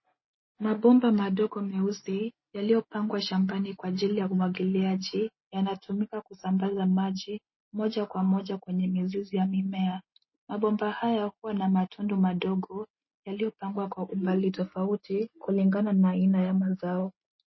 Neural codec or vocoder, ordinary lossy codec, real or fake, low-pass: none; MP3, 24 kbps; real; 7.2 kHz